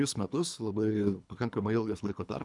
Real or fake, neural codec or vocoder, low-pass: fake; codec, 24 kHz, 3 kbps, HILCodec; 10.8 kHz